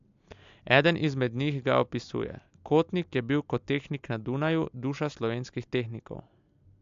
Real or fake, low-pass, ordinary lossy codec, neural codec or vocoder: real; 7.2 kHz; AAC, 64 kbps; none